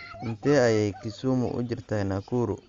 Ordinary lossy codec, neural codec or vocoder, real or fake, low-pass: Opus, 32 kbps; none; real; 7.2 kHz